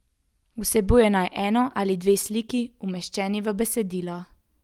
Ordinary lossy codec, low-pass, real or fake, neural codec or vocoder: Opus, 32 kbps; 19.8 kHz; real; none